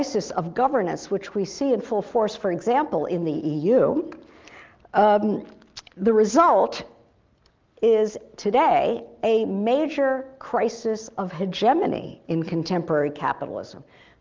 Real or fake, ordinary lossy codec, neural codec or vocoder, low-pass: real; Opus, 24 kbps; none; 7.2 kHz